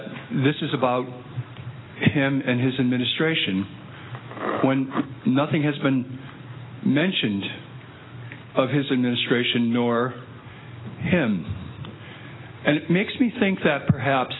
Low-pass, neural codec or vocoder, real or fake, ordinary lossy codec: 7.2 kHz; none; real; AAC, 16 kbps